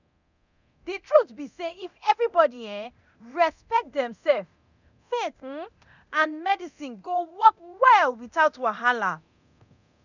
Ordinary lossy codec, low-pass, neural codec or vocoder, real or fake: none; 7.2 kHz; codec, 24 kHz, 0.9 kbps, DualCodec; fake